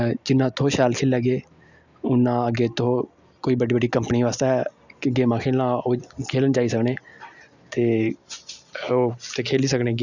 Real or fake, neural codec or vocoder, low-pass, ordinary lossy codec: real; none; 7.2 kHz; none